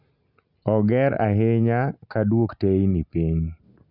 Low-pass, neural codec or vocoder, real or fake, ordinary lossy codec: 5.4 kHz; none; real; none